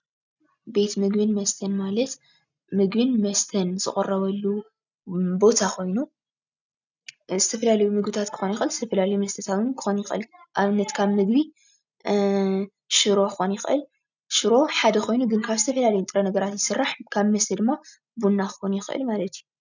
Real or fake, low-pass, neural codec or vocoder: real; 7.2 kHz; none